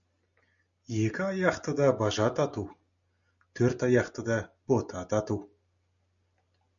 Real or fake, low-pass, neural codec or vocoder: real; 7.2 kHz; none